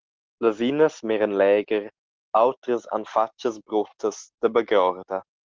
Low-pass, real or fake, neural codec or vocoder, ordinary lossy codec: 7.2 kHz; real; none; Opus, 16 kbps